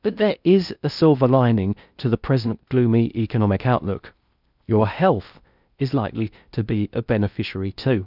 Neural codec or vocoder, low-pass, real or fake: codec, 16 kHz in and 24 kHz out, 0.6 kbps, FocalCodec, streaming, 2048 codes; 5.4 kHz; fake